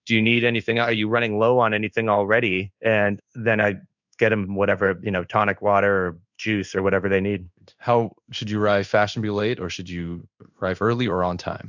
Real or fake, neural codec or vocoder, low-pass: fake; codec, 16 kHz in and 24 kHz out, 1 kbps, XY-Tokenizer; 7.2 kHz